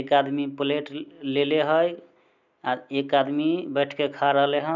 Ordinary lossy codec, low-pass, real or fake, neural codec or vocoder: none; 7.2 kHz; real; none